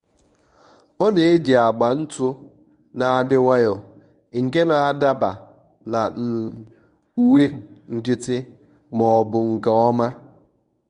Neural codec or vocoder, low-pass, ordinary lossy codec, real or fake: codec, 24 kHz, 0.9 kbps, WavTokenizer, medium speech release version 1; 10.8 kHz; none; fake